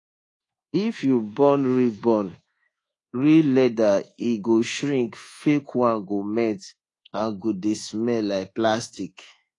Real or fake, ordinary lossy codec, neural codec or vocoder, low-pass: fake; AAC, 32 kbps; codec, 24 kHz, 1.2 kbps, DualCodec; 10.8 kHz